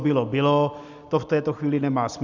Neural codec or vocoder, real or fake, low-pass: none; real; 7.2 kHz